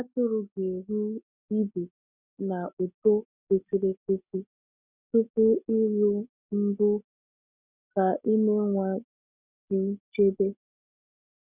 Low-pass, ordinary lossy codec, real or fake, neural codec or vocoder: 3.6 kHz; none; real; none